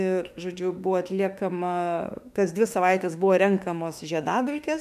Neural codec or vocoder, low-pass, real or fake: autoencoder, 48 kHz, 32 numbers a frame, DAC-VAE, trained on Japanese speech; 14.4 kHz; fake